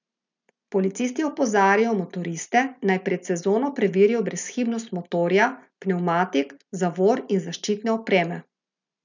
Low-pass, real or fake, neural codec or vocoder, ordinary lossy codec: 7.2 kHz; real; none; none